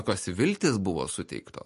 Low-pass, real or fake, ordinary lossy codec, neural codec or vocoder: 14.4 kHz; real; MP3, 48 kbps; none